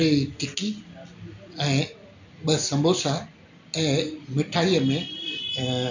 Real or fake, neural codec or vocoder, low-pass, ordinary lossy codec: real; none; 7.2 kHz; none